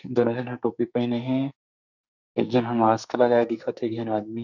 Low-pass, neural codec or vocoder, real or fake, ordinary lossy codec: 7.2 kHz; codec, 44.1 kHz, 2.6 kbps, SNAC; fake; none